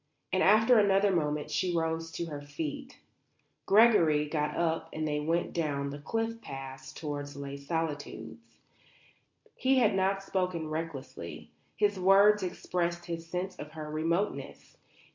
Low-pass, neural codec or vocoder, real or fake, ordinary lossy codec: 7.2 kHz; none; real; MP3, 48 kbps